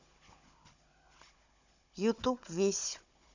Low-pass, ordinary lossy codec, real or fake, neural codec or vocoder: 7.2 kHz; none; fake; codec, 16 kHz, 16 kbps, FunCodec, trained on Chinese and English, 50 frames a second